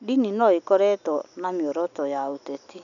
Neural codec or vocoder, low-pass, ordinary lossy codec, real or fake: none; 7.2 kHz; none; real